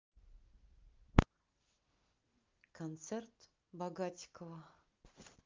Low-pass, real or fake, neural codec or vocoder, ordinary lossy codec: 7.2 kHz; real; none; Opus, 24 kbps